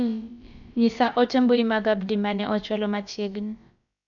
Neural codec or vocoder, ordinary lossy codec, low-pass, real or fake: codec, 16 kHz, about 1 kbps, DyCAST, with the encoder's durations; none; 7.2 kHz; fake